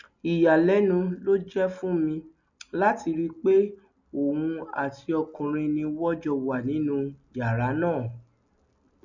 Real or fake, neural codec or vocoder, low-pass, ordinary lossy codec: real; none; 7.2 kHz; none